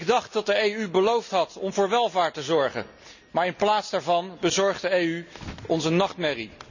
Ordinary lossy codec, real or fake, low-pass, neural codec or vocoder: none; real; 7.2 kHz; none